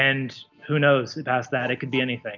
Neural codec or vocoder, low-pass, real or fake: none; 7.2 kHz; real